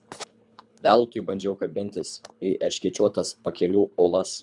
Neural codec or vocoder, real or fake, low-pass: codec, 24 kHz, 3 kbps, HILCodec; fake; 10.8 kHz